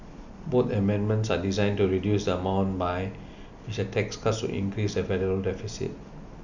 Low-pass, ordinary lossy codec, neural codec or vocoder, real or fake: 7.2 kHz; none; none; real